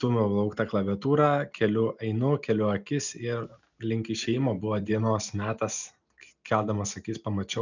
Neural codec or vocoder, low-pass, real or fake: none; 7.2 kHz; real